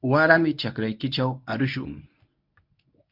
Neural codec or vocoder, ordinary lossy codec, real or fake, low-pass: codec, 24 kHz, 0.9 kbps, WavTokenizer, medium speech release version 1; MP3, 48 kbps; fake; 5.4 kHz